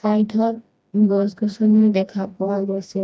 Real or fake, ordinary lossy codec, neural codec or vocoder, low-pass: fake; none; codec, 16 kHz, 1 kbps, FreqCodec, smaller model; none